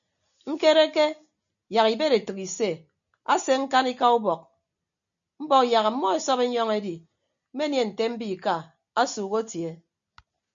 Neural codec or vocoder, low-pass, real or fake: none; 7.2 kHz; real